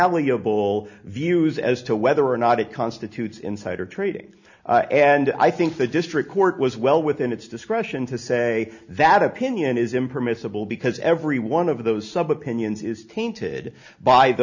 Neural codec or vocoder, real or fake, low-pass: none; real; 7.2 kHz